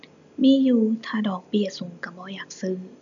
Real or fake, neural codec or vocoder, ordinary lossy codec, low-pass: real; none; none; 7.2 kHz